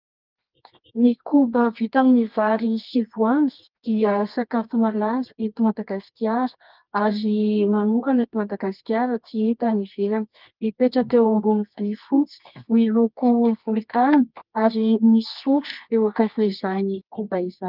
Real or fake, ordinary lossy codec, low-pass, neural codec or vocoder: fake; Opus, 24 kbps; 5.4 kHz; codec, 24 kHz, 0.9 kbps, WavTokenizer, medium music audio release